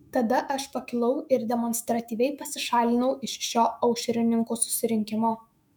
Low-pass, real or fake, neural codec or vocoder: 19.8 kHz; fake; autoencoder, 48 kHz, 128 numbers a frame, DAC-VAE, trained on Japanese speech